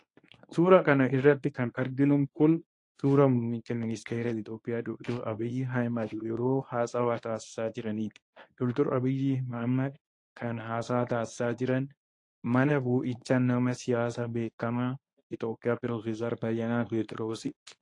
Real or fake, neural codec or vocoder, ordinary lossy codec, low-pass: fake; codec, 24 kHz, 0.9 kbps, WavTokenizer, medium speech release version 1; AAC, 48 kbps; 10.8 kHz